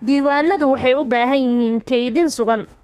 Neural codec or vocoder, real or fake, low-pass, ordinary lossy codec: codec, 32 kHz, 1.9 kbps, SNAC; fake; 14.4 kHz; none